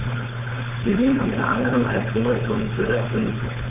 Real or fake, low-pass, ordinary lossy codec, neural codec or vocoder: fake; 3.6 kHz; none; codec, 16 kHz, 4.8 kbps, FACodec